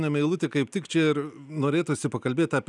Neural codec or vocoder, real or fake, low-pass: none; real; 10.8 kHz